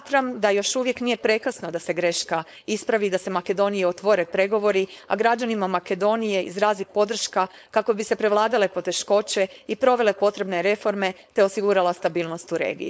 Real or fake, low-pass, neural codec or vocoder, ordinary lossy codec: fake; none; codec, 16 kHz, 4.8 kbps, FACodec; none